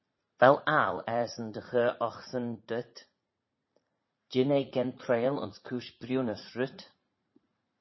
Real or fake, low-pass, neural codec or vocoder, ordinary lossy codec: fake; 7.2 kHz; vocoder, 44.1 kHz, 80 mel bands, Vocos; MP3, 24 kbps